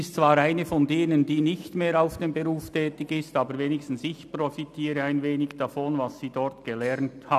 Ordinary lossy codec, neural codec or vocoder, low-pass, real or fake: none; none; 14.4 kHz; real